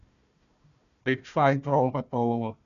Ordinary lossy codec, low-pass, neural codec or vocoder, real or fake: none; 7.2 kHz; codec, 16 kHz, 1 kbps, FunCodec, trained on Chinese and English, 50 frames a second; fake